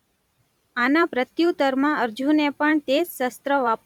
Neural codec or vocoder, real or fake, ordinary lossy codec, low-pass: vocoder, 44.1 kHz, 128 mel bands every 512 samples, BigVGAN v2; fake; none; 19.8 kHz